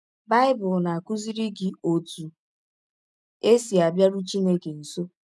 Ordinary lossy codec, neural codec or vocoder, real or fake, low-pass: none; none; real; none